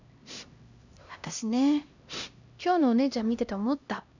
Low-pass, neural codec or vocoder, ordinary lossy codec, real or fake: 7.2 kHz; codec, 16 kHz, 1 kbps, X-Codec, HuBERT features, trained on LibriSpeech; none; fake